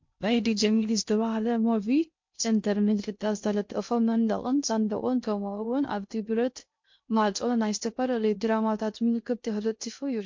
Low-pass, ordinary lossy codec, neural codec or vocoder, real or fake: 7.2 kHz; MP3, 48 kbps; codec, 16 kHz in and 24 kHz out, 0.6 kbps, FocalCodec, streaming, 2048 codes; fake